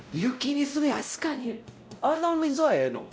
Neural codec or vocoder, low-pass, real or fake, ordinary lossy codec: codec, 16 kHz, 0.5 kbps, X-Codec, WavLM features, trained on Multilingual LibriSpeech; none; fake; none